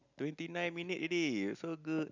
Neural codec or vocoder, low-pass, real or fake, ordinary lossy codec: none; 7.2 kHz; real; none